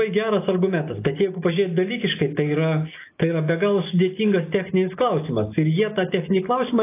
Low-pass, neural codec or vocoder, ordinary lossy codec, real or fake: 3.6 kHz; none; AAC, 24 kbps; real